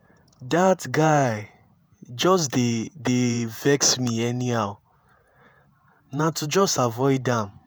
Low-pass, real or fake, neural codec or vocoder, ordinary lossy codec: none; fake; vocoder, 48 kHz, 128 mel bands, Vocos; none